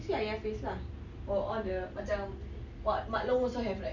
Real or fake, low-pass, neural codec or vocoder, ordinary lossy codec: real; 7.2 kHz; none; none